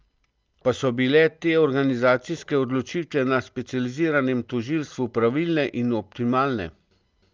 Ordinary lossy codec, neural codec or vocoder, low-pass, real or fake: Opus, 24 kbps; none; 7.2 kHz; real